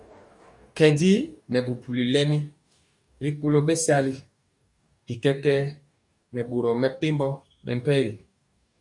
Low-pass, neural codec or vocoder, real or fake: 10.8 kHz; codec, 44.1 kHz, 2.6 kbps, DAC; fake